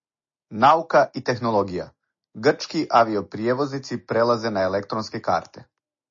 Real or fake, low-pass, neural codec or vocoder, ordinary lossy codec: real; 7.2 kHz; none; MP3, 32 kbps